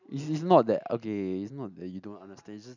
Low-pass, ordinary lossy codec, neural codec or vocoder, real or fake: 7.2 kHz; none; none; real